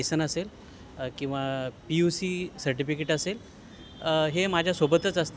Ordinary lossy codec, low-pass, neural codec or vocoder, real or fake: none; none; none; real